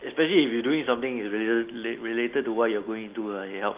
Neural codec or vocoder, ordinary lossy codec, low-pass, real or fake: none; Opus, 32 kbps; 3.6 kHz; real